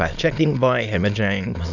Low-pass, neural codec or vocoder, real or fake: 7.2 kHz; autoencoder, 22.05 kHz, a latent of 192 numbers a frame, VITS, trained on many speakers; fake